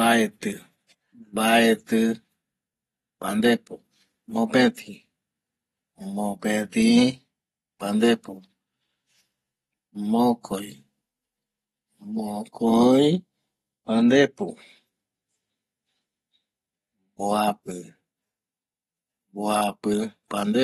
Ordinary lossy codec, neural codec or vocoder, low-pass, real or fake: AAC, 32 kbps; none; 19.8 kHz; real